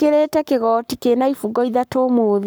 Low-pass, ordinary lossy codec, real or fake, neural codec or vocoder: none; none; fake; codec, 44.1 kHz, 7.8 kbps, Pupu-Codec